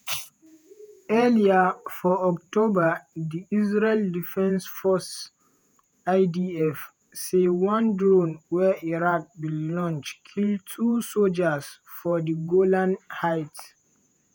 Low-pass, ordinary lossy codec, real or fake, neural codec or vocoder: none; none; fake; vocoder, 48 kHz, 128 mel bands, Vocos